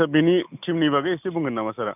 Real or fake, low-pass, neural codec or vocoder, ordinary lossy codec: real; 3.6 kHz; none; none